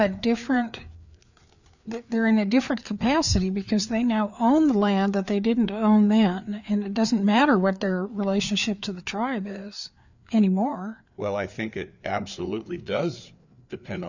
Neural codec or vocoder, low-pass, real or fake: codec, 16 kHz, 4 kbps, FreqCodec, larger model; 7.2 kHz; fake